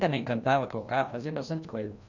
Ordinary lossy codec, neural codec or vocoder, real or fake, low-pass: none; codec, 16 kHz, 1 kbps, FreqCodec, larger model; fake; 7.2 kHz